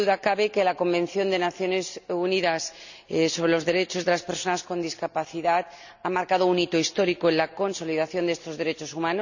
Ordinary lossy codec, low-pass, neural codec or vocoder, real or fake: none; 7.2 kHz; none; real